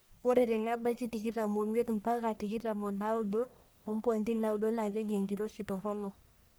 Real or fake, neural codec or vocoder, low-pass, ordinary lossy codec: fake; codec, 44.1 kHz, 1.7 kbps, Pupu-Codec; none; none